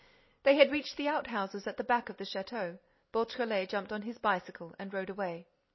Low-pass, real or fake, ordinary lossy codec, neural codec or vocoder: 7.2 kHz; real; MP3, 24 kbps; none